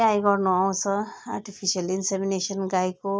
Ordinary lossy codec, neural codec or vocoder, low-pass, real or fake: none; none; none; real